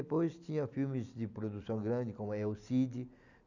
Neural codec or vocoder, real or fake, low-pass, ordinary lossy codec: none; real; 7.2 kHz; none